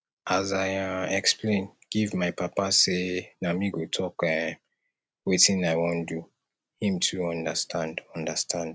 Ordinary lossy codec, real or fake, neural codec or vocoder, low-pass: none; real; none; none